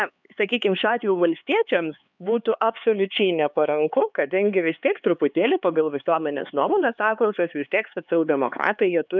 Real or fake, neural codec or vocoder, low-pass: fake; codec, 16 kHz, 4 kbps, X-Codec, HuBERT features, trained on LibriSpeech; 7.2 kHz